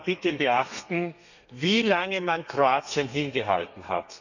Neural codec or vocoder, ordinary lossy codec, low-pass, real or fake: codec, 32 kHz, 1.9 kbps, SNAC; none; 7.2 kHz; fake